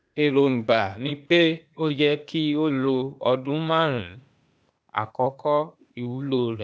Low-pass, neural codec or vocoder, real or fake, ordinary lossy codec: none; codec, 16 kHz, 0.8 kbps, ZipCodec; fake; none